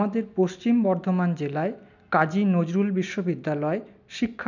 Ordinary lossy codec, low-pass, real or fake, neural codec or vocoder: none; 7.2 kHz; real; none